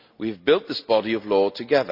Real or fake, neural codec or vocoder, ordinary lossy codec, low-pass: real; none; none; 5.4 kHz